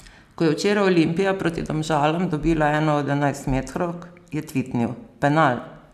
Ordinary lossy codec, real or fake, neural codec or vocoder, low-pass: none; real; none; 14.4 kHz